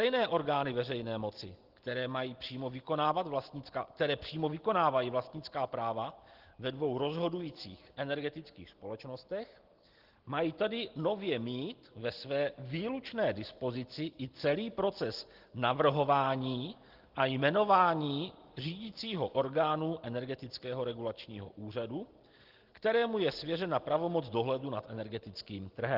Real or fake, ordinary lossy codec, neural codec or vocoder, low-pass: real; Opus, 16 kbps; none; 5.4 kHz